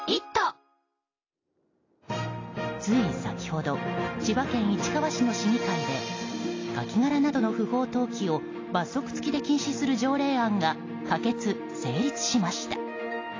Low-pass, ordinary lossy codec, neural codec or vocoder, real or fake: 7.2 kHz; AAC, 32 kbps; none; real